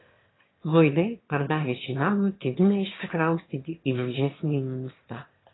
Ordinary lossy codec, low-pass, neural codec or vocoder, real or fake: AAC, 16 kbps; 7.2 kHz; autoencoder, 22.05 kHz, a latent of 192 numbers a frame, VITS, trained on one speaker; fake